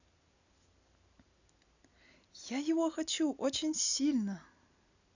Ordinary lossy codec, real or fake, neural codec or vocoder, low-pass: none; real; none; 7.2 kHz